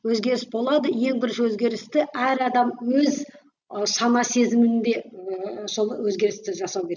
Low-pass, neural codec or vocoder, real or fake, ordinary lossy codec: 7.2 kHz; none; real; none